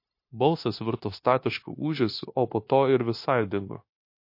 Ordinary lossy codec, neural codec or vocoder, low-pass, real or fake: MP3, 32 kbps; codec, 16 kHz, 0.9 kbps, LongCat-Audio-Codec; 5.4 kHz; fake